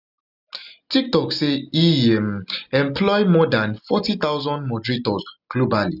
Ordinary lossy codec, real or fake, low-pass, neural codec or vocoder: none; real; 5.4 kHz; none